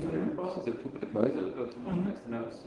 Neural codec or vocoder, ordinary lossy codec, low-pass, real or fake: codec, 24 kHz, 0.9 kbps, WavTokenizer, medium speech release version 1; Opus, 24 kbps; 10.8 kHz; fake